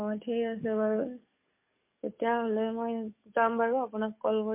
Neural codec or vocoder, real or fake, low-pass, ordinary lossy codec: codec, 44.1 kHz, 7.8 kbps, DAC; fake; 3.6 kHz; MP3, 32 kbps